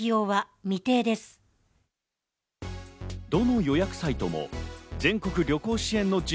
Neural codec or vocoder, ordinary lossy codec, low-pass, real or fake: none; none; none; real